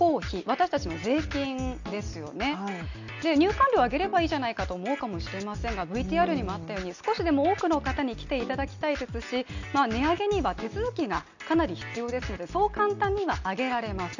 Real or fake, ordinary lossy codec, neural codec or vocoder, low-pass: real; none; none; 7.2 kHz